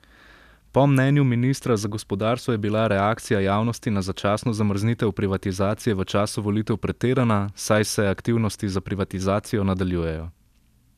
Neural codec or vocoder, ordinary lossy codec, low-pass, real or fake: none; none; 14.4 kHz; real